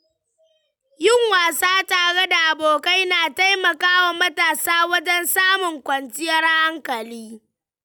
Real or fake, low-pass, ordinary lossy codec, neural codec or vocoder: real; none; none; none